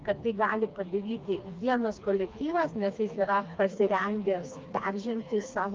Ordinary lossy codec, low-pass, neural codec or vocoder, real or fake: Opus, 24 kbps; 7.2 kHz; codec, 16 kHz, 2 kbps, FreqCodec, smaller model; fake